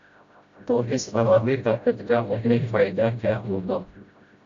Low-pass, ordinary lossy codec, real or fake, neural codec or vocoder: 7.2 kHz; AAC, 64 kbps; fake; codec, 16 kHz, 0.5 kbps, FreqCodec, smaller model